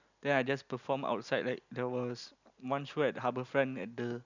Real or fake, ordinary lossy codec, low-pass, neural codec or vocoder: real; none; 7.2 kHz; none